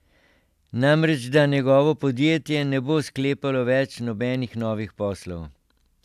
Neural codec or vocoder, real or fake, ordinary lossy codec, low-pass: none; real; AAC, 96 kbps; 14.4 kHz